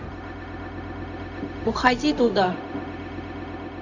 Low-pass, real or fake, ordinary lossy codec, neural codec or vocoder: 7.2 kHz; fake; none; codec, 16 kHz, 0.4 kbps, LongCat-Audio-Codec